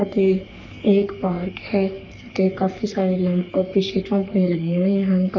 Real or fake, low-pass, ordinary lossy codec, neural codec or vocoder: fake; 7.2 kHz; none; codec, 44.1 kHz, 3.4 kbps, Pupu-Codec